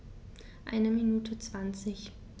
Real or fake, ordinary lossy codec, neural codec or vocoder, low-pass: real; none; none; none